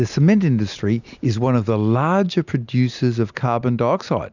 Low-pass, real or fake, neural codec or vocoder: 7.2 kHz; real; none